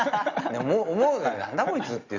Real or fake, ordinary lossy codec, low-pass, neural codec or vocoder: real; Opus, 64 kbps; 7.2 kHz; none